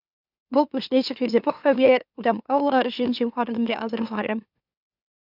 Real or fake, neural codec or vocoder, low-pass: fake; autoencoder, 44.1 kHz, a latent of 192 numbers a frame, MeloTTS; 5.4 kHz